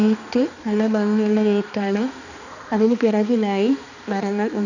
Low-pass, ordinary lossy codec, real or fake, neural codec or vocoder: 7.2 kHz; none; fake; codec, 16 kHz, 2 kbps, X-Codec, HuBERT features, trained on balanced general audio